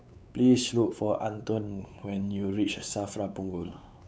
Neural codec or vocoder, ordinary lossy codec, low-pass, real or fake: codec, 16 kHz, 4 kbps, X-Codec, WavLM features, trained on Multilingual LibriSpeech; none; none; fake